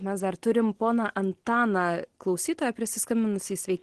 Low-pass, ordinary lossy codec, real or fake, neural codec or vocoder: 10.8 kHz; Opus, 16 kbps; real; none